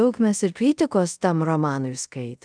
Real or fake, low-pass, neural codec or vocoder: fake; 9.9 kHz; codec, 24 kHz, 0.5 kbps, DualCodec